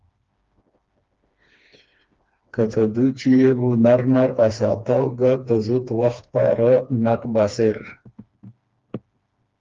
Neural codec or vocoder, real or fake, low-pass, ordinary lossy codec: codec, 16 kHz, 2 kbps, FreqCodec, smaller model; fake; 7.2 kHz; Opus, 32 kbps